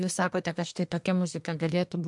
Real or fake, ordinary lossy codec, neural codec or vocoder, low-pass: fake; MP3, 64 kbps; codec, 24 kHz, 1 kbps, SNAC; 10.8 kHz